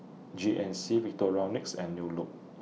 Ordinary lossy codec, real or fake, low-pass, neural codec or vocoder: none; real; none; none